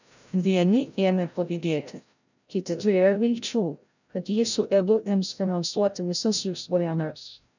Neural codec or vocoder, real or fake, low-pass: codec, 16 kHz, 0.5 kbps, FreqCodec, larger model; fake; 7.2 kHz